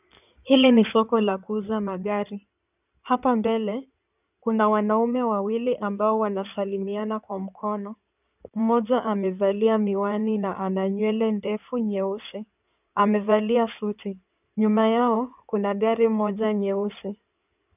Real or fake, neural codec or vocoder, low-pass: fake; codec, 16 kHz in and 24 kHz out, 2.2 kbps, FireRedTTS-2 codec; 3.6 kHz